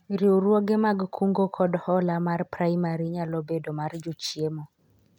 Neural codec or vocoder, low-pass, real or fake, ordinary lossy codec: none; 19.8 kHz; real; none